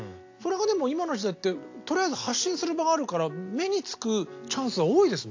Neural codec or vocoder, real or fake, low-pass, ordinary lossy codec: none; real; 7.2 kHz; AAC, 48 kbps